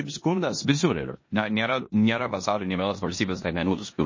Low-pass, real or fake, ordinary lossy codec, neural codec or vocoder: 7.2 kHz; fake; MP3, 32 kbps; codec, 16 kHz in and 24 kHz out, 0.9 kbps, LongCat-Audio-Codec, four codebook decoder